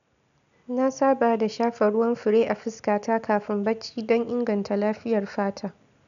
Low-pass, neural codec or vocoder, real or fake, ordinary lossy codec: 7.2 kHz; none; real; none